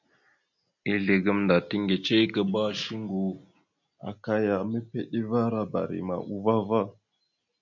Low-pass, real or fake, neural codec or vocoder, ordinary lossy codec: 7.2 kHz; real; none; MP3, 64 kbps